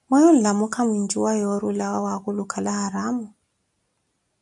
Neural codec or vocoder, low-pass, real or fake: none; 10.8 kHz; real